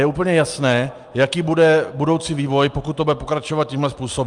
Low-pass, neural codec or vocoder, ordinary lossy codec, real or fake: 10.8 kHz; none; Opus, 32 kbps; real